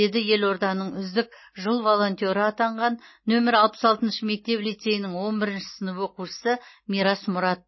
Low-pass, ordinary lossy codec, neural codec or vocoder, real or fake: 7.2 kHz; MP3, 24 kbps; none; real